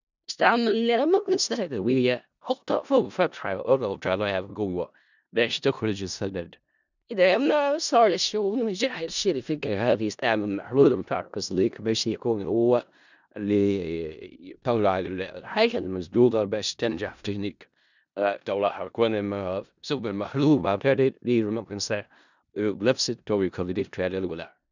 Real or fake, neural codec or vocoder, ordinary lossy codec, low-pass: fake; codec, 16 kHz in and 24 kHz out, 0.4 kbps, LongCat-Audio-Codec, four codebook decoder; none; 7.2 kHz